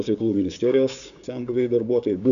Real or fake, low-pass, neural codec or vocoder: fake; 7.2 kHz; codec, 16 kHz, 6 kbps, DAC